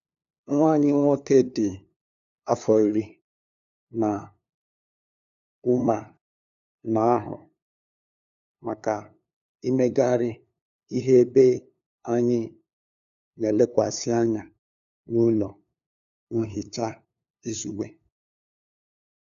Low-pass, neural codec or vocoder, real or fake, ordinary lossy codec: 7.2 kHz; codec, 16 kHz, 2 kbps, FunCodec, trained on LibriTTS, 25 frames a second; fake; none